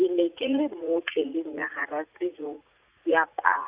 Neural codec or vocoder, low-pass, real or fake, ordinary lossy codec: vocoder, 44.1 kHz, 80 mel bands, Vocos; 3.6 kHz; fake; Opus, 64 kbps